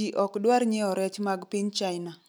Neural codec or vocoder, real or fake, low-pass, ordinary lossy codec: none; real; none; none